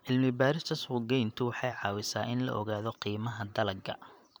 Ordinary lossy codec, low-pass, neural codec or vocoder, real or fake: none; none; vocoder, 44.1 kHz, 128 mel bands every 512 samples, BigVGAN v2; fake